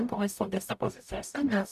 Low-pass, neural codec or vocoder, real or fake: 14.4 kHz; codec, 44.1 kHz, 0.9 kbps, DAC; fake